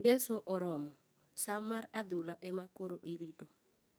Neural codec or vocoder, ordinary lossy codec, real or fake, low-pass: codec, 44.1 kHz, 2.6 kbps, SNAC; none; fake; none